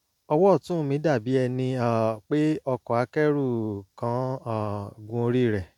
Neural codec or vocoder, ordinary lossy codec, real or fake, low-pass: none; none; real; 19.8 kHz